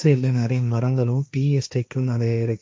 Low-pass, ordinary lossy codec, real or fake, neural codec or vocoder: none; none; fake; codec, 16 kHz, 1.1 kbps, Voila-Tokenizer